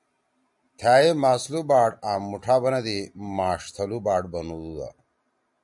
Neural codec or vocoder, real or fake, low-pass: none; real; 10.8 kHz